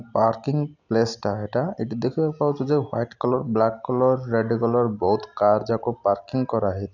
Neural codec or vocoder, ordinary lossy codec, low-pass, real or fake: none; none; 7.2 kHz; real